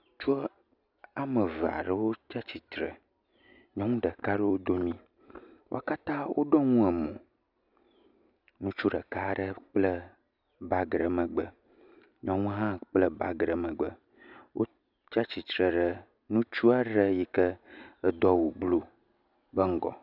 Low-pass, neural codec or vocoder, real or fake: 5.4 kHz; none; real